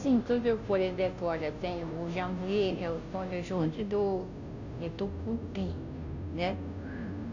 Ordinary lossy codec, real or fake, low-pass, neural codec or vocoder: none; fake; 7.2 kHz; codec, 16 kHz, 0.5 kbps, FunCodec, trained on Chinese and English, 25 frames a second